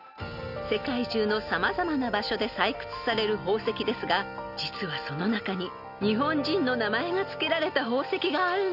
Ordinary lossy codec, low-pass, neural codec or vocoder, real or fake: none; 5.4 kHz; none; real